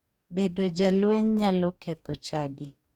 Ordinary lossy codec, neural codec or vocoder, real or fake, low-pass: Opus, 64 kbps; codec, 44.1 kHz, 2.6 kbps, DAC; fake; 19.8 kHz